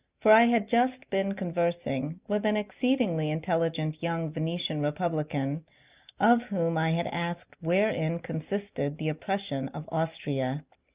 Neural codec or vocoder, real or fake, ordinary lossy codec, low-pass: none; real; Opus, 64 kbps; 3.6 kHz